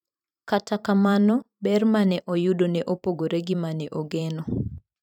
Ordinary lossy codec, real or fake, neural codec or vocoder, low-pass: none; fake; vocoder, 44.1 kHz, 128 mel bands every 512 samples, BigVGAN v2; 19.8 kHz